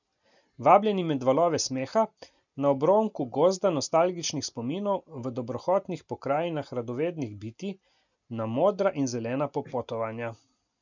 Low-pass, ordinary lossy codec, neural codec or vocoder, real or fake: 7.2 kHz; none; none; real